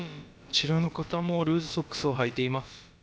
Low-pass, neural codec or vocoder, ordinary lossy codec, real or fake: none; codec, 16 kHz, about 1 kbps, DyCAST, with the encoder's durations; none; fake